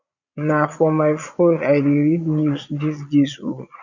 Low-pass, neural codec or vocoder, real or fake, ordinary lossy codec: 7.2 kHz; vocoder, 22.05 kHz, 80 mel bands, Vocos; fake; none